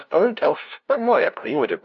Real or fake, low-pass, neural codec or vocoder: fake; 7.2 kHz; codec, 16 kHz, 0.5 kbps, FunCodec, trained on LibriTTS, 25 frames a second